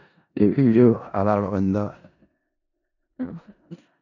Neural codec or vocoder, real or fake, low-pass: codec, 16 kHz in and 24 kHz out, 0.4 kbps, LongCat-Audio-Codec, four codebook decoder; fake; 7.2 kHz